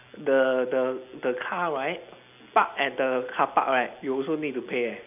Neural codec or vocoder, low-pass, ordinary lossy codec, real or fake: none; 3.6 kHz; none; real